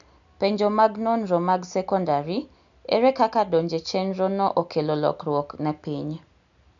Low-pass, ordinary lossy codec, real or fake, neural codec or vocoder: 7.2 kHz; none; real; none